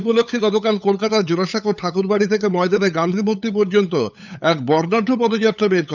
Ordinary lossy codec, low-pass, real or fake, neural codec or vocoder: none; 7.2 kHz; fake; codec, 16 kHz, 8 kbps, FunCodec, trained on LibriTTS, 25 frames a second